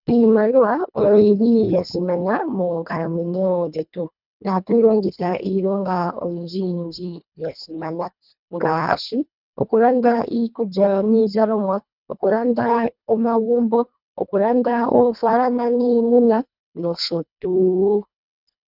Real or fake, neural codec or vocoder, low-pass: fake; codec, 24 kHz, 1.5 kbps, HILCodec; 5.4 kHz